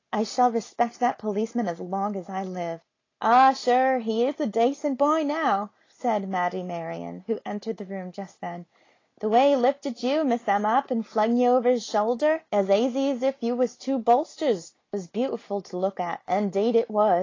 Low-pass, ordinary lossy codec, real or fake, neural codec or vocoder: 7.2 kHz; AAC, 32 kbps; real; none